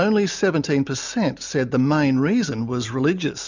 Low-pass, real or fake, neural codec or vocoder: 7.2 kHz; real; none